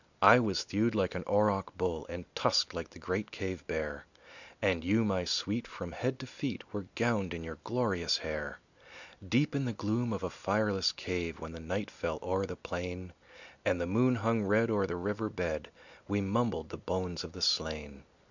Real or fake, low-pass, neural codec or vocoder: real; 7.2 kHz; none